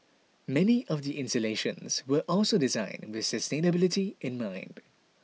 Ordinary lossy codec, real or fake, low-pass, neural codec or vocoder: none; real; none; none